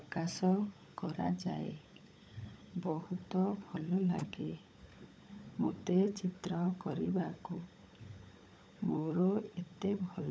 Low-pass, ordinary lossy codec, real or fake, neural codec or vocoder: none; none; fake; codec, 16 kHz, 16 kbps, FunCodec, trained on LibriTTS, 50 frames a second